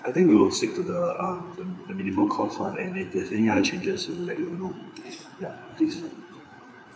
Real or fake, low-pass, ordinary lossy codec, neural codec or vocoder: fake; none; none; codec, 16 kHz, 4 kbps, FreqCodec, larger model